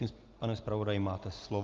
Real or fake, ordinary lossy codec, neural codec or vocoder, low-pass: real; Opus, 32 kbps; none; 7.2 kHz